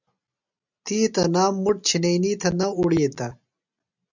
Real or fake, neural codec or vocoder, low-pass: real; none; 7.2 kHz